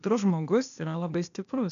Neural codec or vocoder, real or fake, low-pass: codec, 16 kHz, 0.8 kbps, ZipCodec; fake; 7.2 kHz